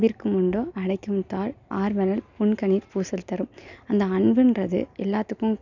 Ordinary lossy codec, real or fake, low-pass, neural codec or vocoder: none; real; 7.2 kHz; none